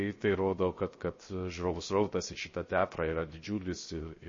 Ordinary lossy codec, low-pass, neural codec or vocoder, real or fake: MP3, 32 kbps; 7.2 kHz; codec, 16 kHz, 0.7 kbps, FocalCodec; fake